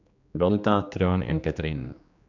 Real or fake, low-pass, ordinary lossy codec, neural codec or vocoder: fake; 7.2 kHz; none; codec, 16 kHz, 2 kbps, X-Codec, HuBERT features, trained on general audio